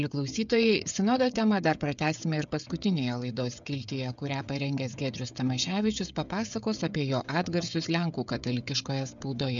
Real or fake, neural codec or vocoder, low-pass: fake; codec, 16 kHz, 16 kbps, FreqCodec, smaller model; 7.2 kHz